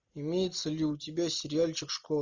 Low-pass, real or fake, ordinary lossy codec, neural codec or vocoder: 7.2 kHz; real; Opus, 64 kbps; none